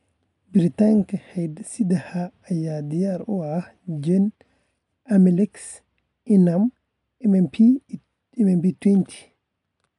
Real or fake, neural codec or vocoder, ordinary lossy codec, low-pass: real; none; none; 10.8 kHz